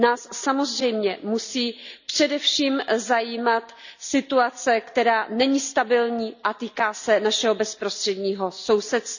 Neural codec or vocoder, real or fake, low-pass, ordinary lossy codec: none; real; 7.2 kHz; none